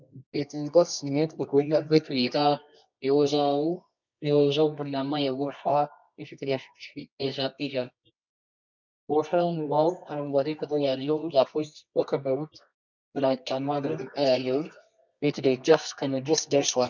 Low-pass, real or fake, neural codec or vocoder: 7.2 kHz; fake; codec, 24 kHz, 0.9 kbps, WavTokenizer, medium music audio release